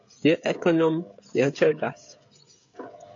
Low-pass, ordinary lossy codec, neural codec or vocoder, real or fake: 7.2 kHz; AAC, 48 kbps; codec, 16 kHz, 16 kbps, FreqCodec, smaller model; fake